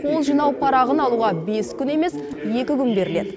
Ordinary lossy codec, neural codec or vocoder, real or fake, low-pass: none; none; real; none